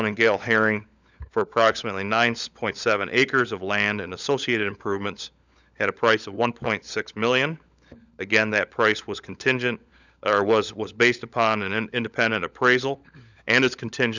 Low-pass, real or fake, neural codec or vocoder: 7.2 kHz; fake; codec, 16 kHz, 16 kbps, FunCodec, trained on LibriTTS, 50 frames a second